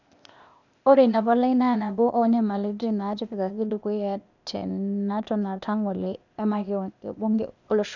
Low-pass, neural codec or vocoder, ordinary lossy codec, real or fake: 7.2 kHz; codec, 16 kHz, 0.8 kbps, ZipCodec; none; fake